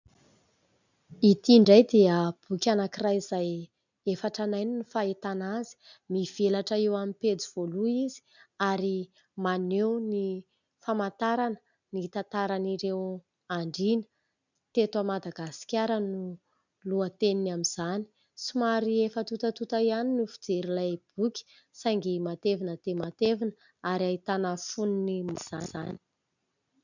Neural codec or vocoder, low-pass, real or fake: none; 7.2 kHz; real